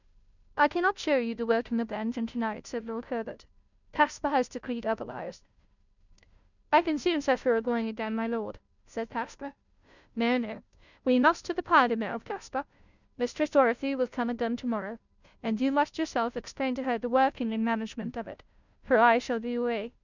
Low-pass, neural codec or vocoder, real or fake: 7.2 kHz; codec, 16 kHz, 0.5 kbps, FunCodec, trained on Chinese and English, 25 frames a second; fake